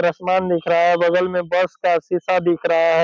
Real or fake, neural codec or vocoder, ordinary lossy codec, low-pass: real; none; none; none